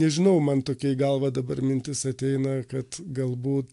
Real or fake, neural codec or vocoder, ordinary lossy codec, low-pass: real; none; AAC, 64 kbps; 10.8 kHz